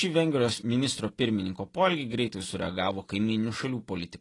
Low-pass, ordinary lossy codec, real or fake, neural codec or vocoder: 10.8 kHz; AAC, 32 kbps; real; none